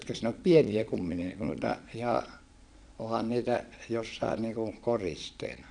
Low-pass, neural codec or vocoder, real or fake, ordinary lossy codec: 9.9 kHz; vocoder, 22.05 kHz, 80 mel bands, Vocos; fake; none